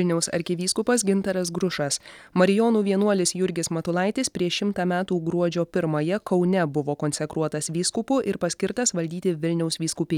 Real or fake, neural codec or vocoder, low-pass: real; none; 19.8 kHz